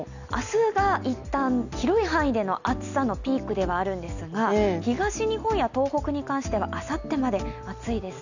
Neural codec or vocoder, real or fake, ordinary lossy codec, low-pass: none; real; none; 7.2 kHz